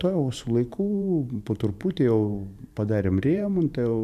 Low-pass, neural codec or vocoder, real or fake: 14.4 kHz; vocoder, 44.1 kHz, 128 mel bands every 512 samples, BigVGAN v2; fake